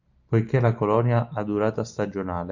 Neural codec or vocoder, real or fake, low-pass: none; real; 7.2 kHz